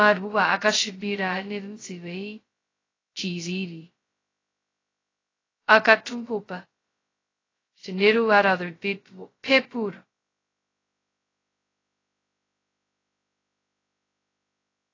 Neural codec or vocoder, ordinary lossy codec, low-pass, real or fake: codec, 16 kHz, 0.2 kbps, FocalCodec; AAC, 32 kbps; 7.2 kHz; fake